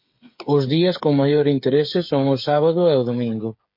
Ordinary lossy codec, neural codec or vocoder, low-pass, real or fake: MP3, 32 kbps; codec, 16 kHz, 8 kbps, FreqCodec, smaller model; 5.4 kHz; fake